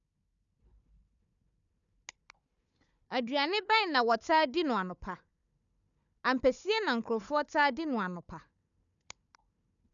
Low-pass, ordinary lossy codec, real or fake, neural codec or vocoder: 7.2 kHz; none; fake; codec, 16 kHz, 4 kbps, FunCodec, trained on Chinese and English, 50 frames a second